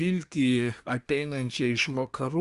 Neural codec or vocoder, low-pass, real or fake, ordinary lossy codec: codec, 24 kHz, 1 kbps, SNAC; 10.8 kHz; fake; Opus, 64 kbps